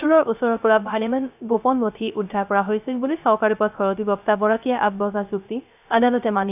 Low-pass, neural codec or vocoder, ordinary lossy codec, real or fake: 3.6 kHz; codec, 16 kHz, 0.3 kbps, FocalCodec; none; fake